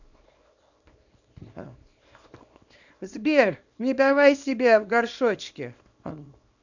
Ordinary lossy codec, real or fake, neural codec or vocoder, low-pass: none; fake; codec, 24 kHz, 0.9 kbps, WavTokenizer, small release; 7.2 kHz